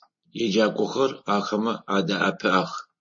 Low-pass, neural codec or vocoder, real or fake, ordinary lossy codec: 7.2 kHz; none; real; MP3, 32 kbps